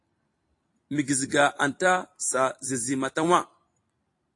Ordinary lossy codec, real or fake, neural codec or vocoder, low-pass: AAC, 48 kbps; fake; vocoder, 44.1 kHz, 128 mel bands every 256 samples, BigVGAN v2; 10.8 kHz